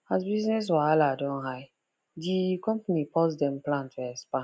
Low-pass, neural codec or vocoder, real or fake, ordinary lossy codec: none; none; real; none